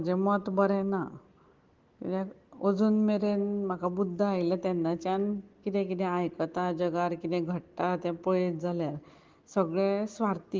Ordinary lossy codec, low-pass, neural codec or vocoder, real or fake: Opus, 16 kbps; 7.2 kHz; none; real